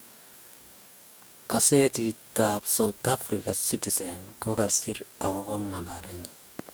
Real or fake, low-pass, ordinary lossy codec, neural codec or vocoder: fake; none; none; codec, 44.1 kHz, 2.6 kbps, DAC